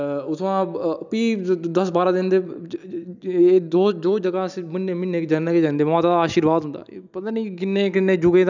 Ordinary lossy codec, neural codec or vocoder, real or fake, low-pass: none; none; real; 7.2 kHz